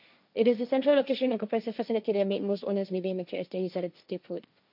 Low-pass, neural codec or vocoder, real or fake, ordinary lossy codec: 5.4 kHz; codec, 16 kHz, 1.1 kbps, Voila-Tokenizer; fake; none